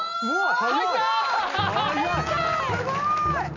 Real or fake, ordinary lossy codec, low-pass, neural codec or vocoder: real; none; 7.2 kHz; none